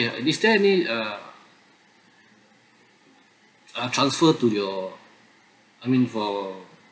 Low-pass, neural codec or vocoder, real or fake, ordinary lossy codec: none; none; real; none